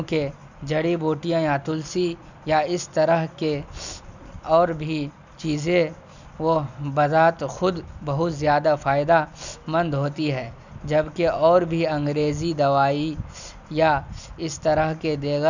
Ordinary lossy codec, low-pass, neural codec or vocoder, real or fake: none; 7.2 kHz; none; real